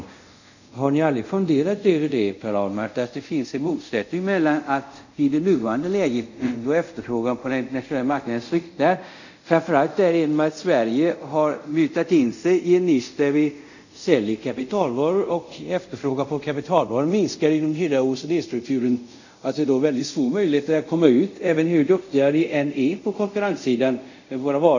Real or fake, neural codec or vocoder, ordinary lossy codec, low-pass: fake; codec, 24 kHz, 0.5 kbps, DualCodec; none; 7.2 kHz